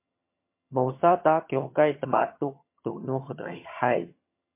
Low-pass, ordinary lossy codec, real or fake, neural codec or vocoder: 3.6 kHz; MP3, 24 kbps; fake; vocoder, 22.05 kHz, 80 mel bands, HiFi-GAN